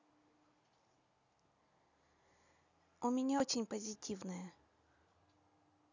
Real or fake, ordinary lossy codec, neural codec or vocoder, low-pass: real; none; none; 7.2 kHz